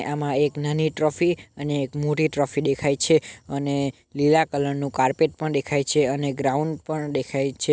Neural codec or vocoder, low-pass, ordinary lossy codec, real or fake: none; none; none; real